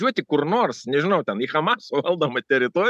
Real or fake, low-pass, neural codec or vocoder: real; 14.4 kHz; none